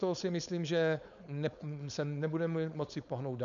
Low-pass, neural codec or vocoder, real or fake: 7.2 kHz; codec, 16 kHz, 4.8 kbps, FACodec; fake